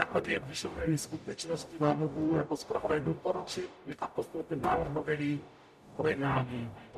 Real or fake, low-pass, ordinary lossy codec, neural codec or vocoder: fake; 14.4 kHz; MP3, 96 kbps; codec, 44.1 kHz, 0.9 kbps, DAC